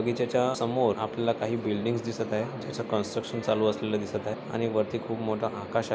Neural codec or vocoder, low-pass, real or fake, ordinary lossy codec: none; none; real; none